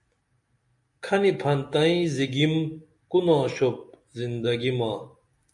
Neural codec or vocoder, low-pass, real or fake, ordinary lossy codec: none; 10.8 kHz; real; AAC, 64 kbps